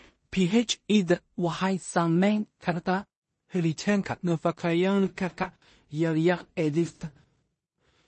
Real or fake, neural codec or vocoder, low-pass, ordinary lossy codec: fake; codec, 16 kHz in and 24 kHz out, 0.4 kbps, LongCat-Audio-Codec, two codebook decoder; 10.8 kHz; MP3, 32 kbps